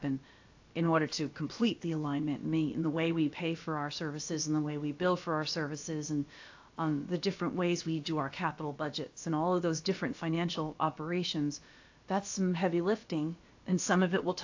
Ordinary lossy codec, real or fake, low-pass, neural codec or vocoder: AAC, 48 kbps; fake; 7.2 kHz; codec, 16 kHz, about 1 kbps, DyCAST, with the encoder's durations